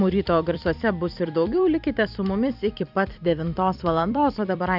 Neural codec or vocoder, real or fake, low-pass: vocoder, 44.1 kHz, 128 mel bands every 256 samples, BigVGAN v2; fake; 5.4 kHz